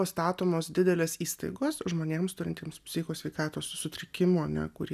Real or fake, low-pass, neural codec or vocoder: real; 14.4 kHz; none